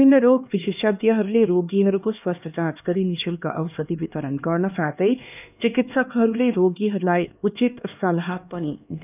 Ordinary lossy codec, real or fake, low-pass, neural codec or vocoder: none; fake; 3.6 kHz; codec, 16 kHz, 2 kbps, X-Codec, HuBERT features, trained on LibriSpeech